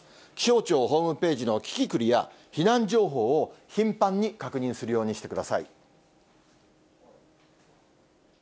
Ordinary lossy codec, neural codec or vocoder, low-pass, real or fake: none; none; none; real